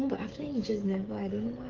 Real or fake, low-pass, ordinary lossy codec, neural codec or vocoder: fake; 7.2 kHz; Opus, 16 kbps; codec, 16 kHz, 16 kbps, FreqCodec, smaller model